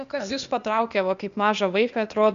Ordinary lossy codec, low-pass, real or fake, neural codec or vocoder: AAC, 96 kbps; 7.2 kHz; fake; codec, 16 kHz, 0.8 kbps, ZipCodec